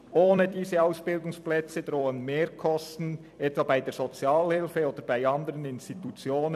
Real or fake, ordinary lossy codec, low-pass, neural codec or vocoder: fake; none; 14.4 kHz; vocoder, 44.1 kHz, 128 mel bands every 256 samples, BigVGAN v2